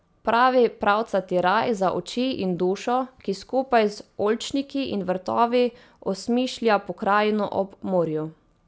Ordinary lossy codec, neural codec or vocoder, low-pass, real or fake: none; none; none; real